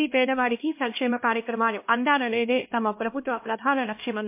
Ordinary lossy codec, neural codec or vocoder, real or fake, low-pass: MP3, 24 kbps; codec, 16 kHz, 1 kbps, X-Codec, HuBERT features, trained on LibriSpeech; fake; 3.6 kHz